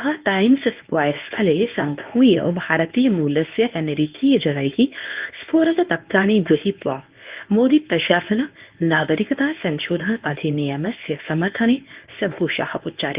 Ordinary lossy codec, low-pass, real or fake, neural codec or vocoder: Opus, 32 kbps; 3.6 kHz; fake; codec, 24 kHz, 0.9 kbps, WavTokenizer, medium speech release version 1